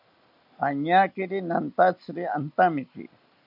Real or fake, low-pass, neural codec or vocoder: real; 5.4 kHz; none